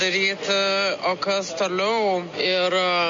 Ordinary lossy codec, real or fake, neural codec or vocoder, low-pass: MP3, 48 kbps; real; none; 7.2 kHz